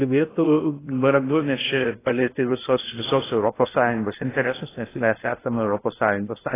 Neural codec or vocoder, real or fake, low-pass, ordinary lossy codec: codec, 16 kHz in and 24 kHz out, 0.6 kbps, FocalCodec, streaming, 2048 codes; fake; 3.6 kHz; AAC, 16 kbps